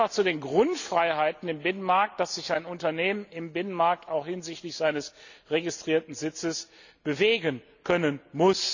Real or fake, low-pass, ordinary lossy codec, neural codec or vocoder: real; 7.2 kHz; none; none